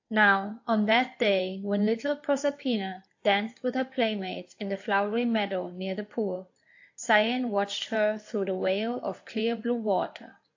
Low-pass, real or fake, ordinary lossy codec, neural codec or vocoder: 7.2 kHz; fake; AAC, 48 kbps; codec, 16 kHz in and 24 kHz out, 2.2 kbps, FireRedTTS-2 codec